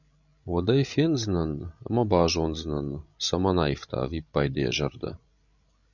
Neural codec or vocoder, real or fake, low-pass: codec, 16 kHz, 16 kbps, FreqCodec, larger model; fake; 7.2 kHz